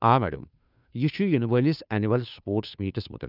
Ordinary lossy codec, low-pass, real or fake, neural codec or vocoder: none; 5.4 kHz; fake; codec, 16 kHz, 2 kbps, FunCodec, trained on Chinese and English, 25 frames a second